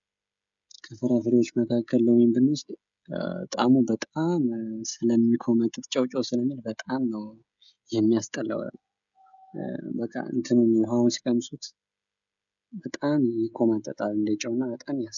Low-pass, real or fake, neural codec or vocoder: 7.2 kHz; fake; codec, 16 kHz, 16 kbps, FreqCodec, smaller model